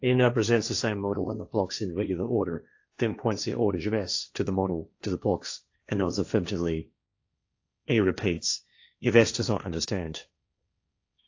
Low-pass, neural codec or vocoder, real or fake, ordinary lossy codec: 7.2 kHz; codec, 16 kHz, 1.1 kbps, Voila-Tokenizer; fake; AAC, 48 kbps